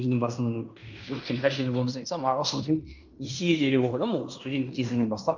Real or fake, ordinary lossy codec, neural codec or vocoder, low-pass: fake; none; codec, 16 kHz in and 24 kHz out, 0.9 kbps, LongCat-Audio-Codec, fine tuned four codebook decoder; 7.2 kHz